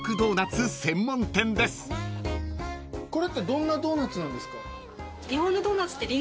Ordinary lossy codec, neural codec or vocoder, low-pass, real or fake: none; none; none; real